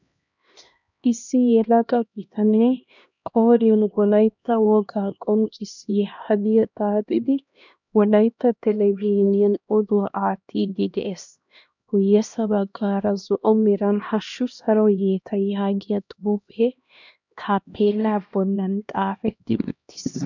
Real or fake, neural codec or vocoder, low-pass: fake; codec, 16 kHz, 1 kbps, X-Codec, HuBERT features, trained on LibriSpeech; 7.2 kHz